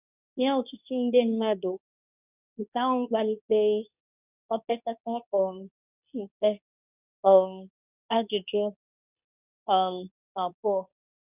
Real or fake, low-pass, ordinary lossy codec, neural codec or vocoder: fake; 3.6 kHz; none; codec, 24 kHz, 0.9 kbps, WavTokenizer, medium speech release version 2